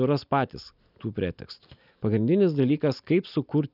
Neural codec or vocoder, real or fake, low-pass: none; real; 5.4 kHz